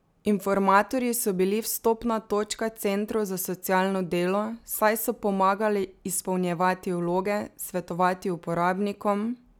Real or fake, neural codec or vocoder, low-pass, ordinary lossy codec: real; none; none; none